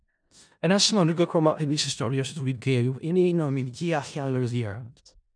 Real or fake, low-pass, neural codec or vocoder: fake; 9.9 kHz; codec, 16 kHz in and 24 kHz out, 0.4 kbps, LongCat-Audio-Codec, four codebook decoder